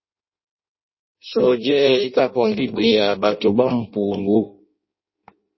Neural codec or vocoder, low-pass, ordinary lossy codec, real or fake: codec, 16 kHz in and 24 kHz out, 0.6 kbps, FireRedTTS-2 codec; 7.2 kHz; MP3, 24 kbps; fake